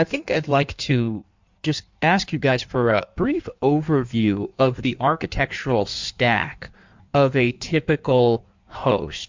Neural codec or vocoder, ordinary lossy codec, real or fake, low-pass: codec, 16 kHz in and 24 kHz out, 1.1 kbps, FireRedTTS-2 codec; MP3, 64 kbps; fake; 7.2 kHz